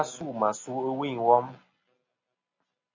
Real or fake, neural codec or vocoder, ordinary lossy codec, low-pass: real; none; MP3, 64 kbps; 7.2 kHz